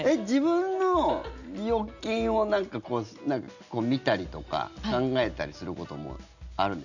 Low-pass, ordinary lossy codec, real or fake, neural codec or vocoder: 7.2 kHz; none; real; none